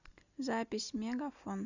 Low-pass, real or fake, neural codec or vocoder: 7.2 kHz; real; none